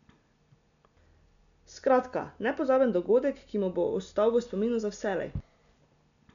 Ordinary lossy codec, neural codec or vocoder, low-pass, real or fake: none; none; 7.2 kHz; real